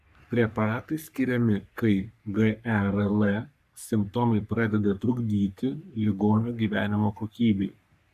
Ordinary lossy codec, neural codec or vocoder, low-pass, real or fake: AAC, 96 kbps; codec, 44.1 kHz, 3.4 kbps, Pupu-Codec; 14.4 kHz; fake